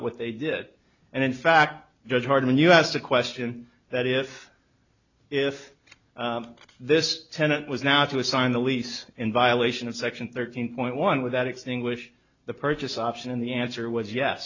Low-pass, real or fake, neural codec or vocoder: 7.2 kHz; real; none